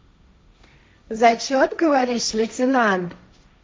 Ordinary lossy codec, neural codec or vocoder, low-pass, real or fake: none; codec, 16 kHz, 1.1 kbps, Voila-Tokenizer; none; fake